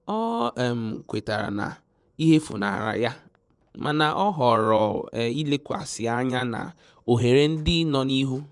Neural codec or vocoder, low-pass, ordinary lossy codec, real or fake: vocoder, 24 kHz, 100 mel bands, Vocos; 10.8 kHz; none; fake